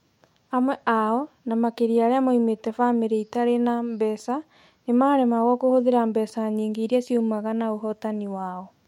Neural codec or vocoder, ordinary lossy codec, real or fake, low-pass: autoencoder, 48 kHz, 128 numbers a frame, DAC-VAE, trained on Japanese speech; MP3, 64 kbps; fake; 19.8 kHz